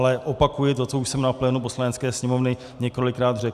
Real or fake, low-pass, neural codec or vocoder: real; 14.4 kHz; none